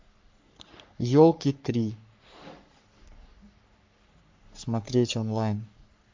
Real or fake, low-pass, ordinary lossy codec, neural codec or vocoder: fake; 7.2 kHz; MP3, 48 kbps; codec, 44.1 kHz, 3.4 kbps, Pupu-Codec